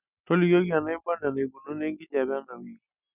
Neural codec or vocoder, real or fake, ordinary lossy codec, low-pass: none; real; none; 3.6 kHz